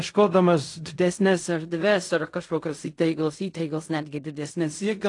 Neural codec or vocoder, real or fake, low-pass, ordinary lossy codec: codec, 16 kHz in and 24 kHz out, 0.4 kbps, LongCat-Audio-Codec, fine tuned four codebook decoder; fake; 10.8 kHz; AAC, 48 kbps